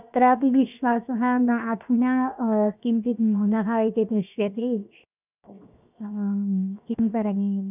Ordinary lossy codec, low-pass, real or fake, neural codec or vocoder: none; 3.6 kHz; fake; codec, 16 kHz, 0.7 kbps, FocalCodec